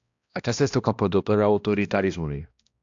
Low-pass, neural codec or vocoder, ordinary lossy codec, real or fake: 7.2 kHz; codec, 16 kHz, 1 kbps, X-Codec, HuBERT features, trained on balanced general audio; AAC, 64 kbps; fake